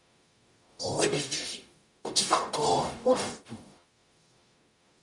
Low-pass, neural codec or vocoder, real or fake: 10.8 kHz; codec, 44.1 kHz, 0.9 kbps, DAC; fake